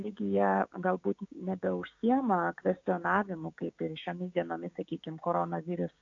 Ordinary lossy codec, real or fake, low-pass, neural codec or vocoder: AAC, 48 kbps; fake; 7.2 kHz; codec, 16 kHz, 6 kbps, DAC